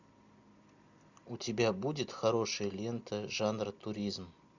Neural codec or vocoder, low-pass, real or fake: none; 7.2 kHz; real